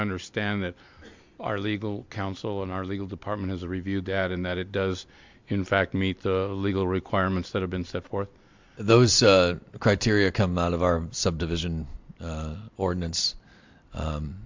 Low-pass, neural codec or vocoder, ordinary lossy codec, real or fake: 7.2 kHz; none; MP3, 64 kbps; real